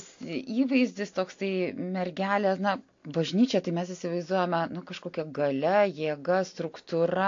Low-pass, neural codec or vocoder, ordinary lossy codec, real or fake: 7.2 kHz; none; AAC, 48 kbps; real